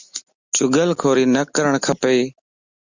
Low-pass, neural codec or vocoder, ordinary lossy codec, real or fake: 7.2 kHz; none; Opus, 64 kbps; real